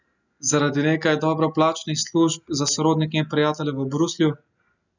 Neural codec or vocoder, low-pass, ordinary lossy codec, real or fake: none; 7.2 kHz; none; real